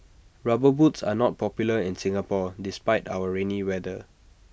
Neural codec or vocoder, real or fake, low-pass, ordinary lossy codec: none; real; none; none